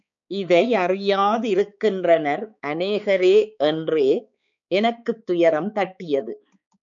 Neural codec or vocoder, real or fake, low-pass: codec, 16 kHz, 4 kbps, X-Codec, HuBERT features, trained on balanced general audio; fake; 7.2 kHz